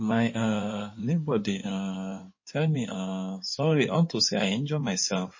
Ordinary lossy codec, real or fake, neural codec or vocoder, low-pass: MP3, 32 kbps; fake; codec, 16 kHz in and 24 kHz out, 2.2 kbps, FireRedTTS-2 codec; 7.2 kHz